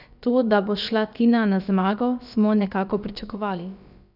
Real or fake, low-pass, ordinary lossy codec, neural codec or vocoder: fake; 5.4 kHz; none; codec, 16 kHz, about 1 kbps, DyCAST, with the encoder's durations